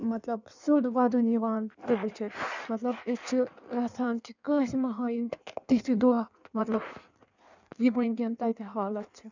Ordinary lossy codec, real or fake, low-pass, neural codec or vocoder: none; fake; 7.2 kHz; codec, 16 kHz in and 24 kHz out, 1.1 kbps, FireRedTTS-2 codec